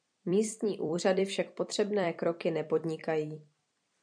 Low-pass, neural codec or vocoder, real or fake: 9.9 kHz; vocoder, 44.1 kHz, 128 mel bands every 256 samples, BigVGAN v2; fake